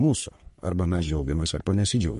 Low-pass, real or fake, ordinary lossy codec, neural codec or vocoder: 14.4 kHz; fake; MP3, 48 kbps; codec, 44.1 kHz, 3.4 kbps, Pupu-Codec